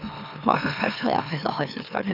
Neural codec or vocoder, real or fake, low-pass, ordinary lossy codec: autoencoder, 44.1 kHz, a latent of 192 numbers a frame, MeloTTS; fake; 5.4 kHz; none